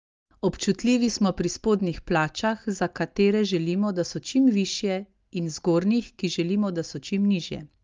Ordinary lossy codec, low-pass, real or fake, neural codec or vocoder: Opus, 32 kbps; 7.2 kHz; real; none